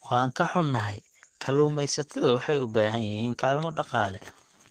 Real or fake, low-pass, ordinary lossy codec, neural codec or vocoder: fake; 14.4 kHz; Opus, 24 kbps; codec, 32 kHz, 1.9 kbps, SNAC